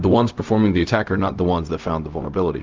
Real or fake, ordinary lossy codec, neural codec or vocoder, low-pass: fake; Opus, 24 kbps; codec, 16 kHz, 0.4 kbps, LongCat-Audio-Codec; 7.2 kHz